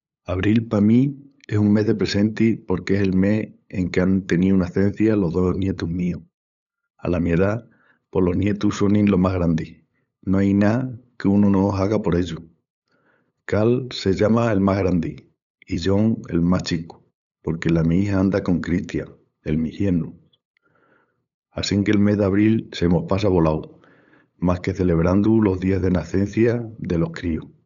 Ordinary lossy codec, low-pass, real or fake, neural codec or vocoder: MP3, 96 kbps; 7.2 kHz; fake; codec, 16 kHz, 8 kbps, FunCodec, trained on LibriTTS, 25 frames a second